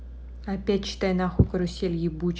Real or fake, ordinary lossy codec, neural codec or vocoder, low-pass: real; none; none; none